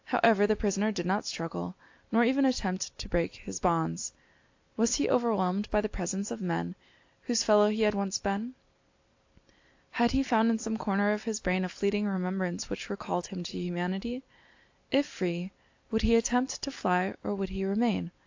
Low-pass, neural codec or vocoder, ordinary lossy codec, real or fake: 7.2 kHz; none; AAC, 48 kbps; real